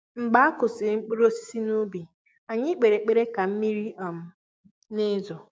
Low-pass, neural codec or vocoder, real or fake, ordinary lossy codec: none; codec, 16 kHz, 6 kbps, DAC; fake; none